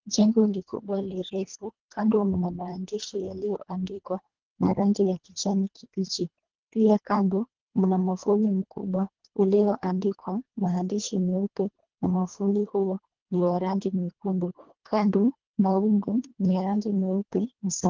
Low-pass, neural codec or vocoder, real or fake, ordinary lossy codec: 7.2 kHz; codec, 24 kHz, 1.5 kbps, HILCodec; fake; Opus, 16 kbps